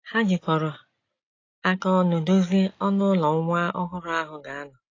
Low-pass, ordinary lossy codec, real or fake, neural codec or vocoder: 7.2 kHz; AAC, 32 kbps; real; none